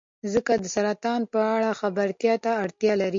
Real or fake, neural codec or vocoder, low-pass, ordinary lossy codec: real; none; 7.2 kHz; MP3, 48 kbps